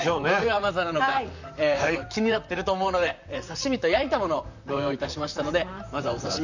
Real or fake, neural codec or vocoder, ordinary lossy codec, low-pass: fake; vocoder, 44.1 kHz, 128 mel bands, Pupu-Vocoder; none; 7.2 kHz